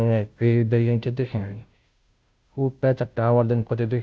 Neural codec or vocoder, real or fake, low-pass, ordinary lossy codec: codec, 16 kHz, 0.5 kbps, FunCodec, trained on Chinese and English, 25 frames a second; fake; none; none